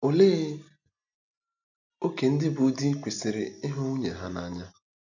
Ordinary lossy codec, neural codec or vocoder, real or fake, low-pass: none; none; real; 7.2 kHz